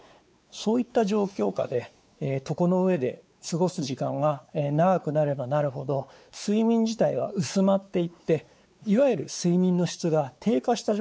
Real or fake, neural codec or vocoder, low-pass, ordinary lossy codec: fake; codec, 16 kHz, 4 kbps, X-Codec, WavLM features, trained on Multilingual LibriSpeech; none; none